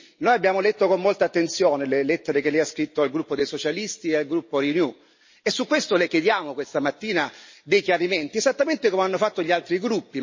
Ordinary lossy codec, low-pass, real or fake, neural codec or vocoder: MP3, 32 kbps; 7.2 kHz; real; none